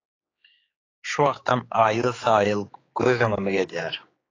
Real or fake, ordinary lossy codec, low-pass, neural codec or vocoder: fake; AAC, 32 kbps; 7.2 kHz; codec, 16 kHz, 4 kbps, X-Codec, HuBERT features, trained on general audio